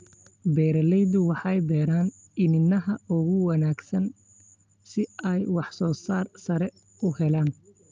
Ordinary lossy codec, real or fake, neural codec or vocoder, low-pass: Opus, 32 kbps; real; none; 7.2 kHz